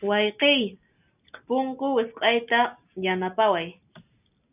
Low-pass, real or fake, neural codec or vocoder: 3.6 kHz; real; none